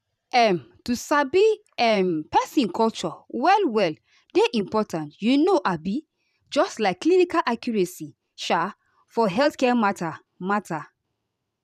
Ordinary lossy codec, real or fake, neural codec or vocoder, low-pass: none; fake; vocoder, 44.1 kHz, 128 mel bands every 512 samples, BigVGAN v2; 14.4 kHz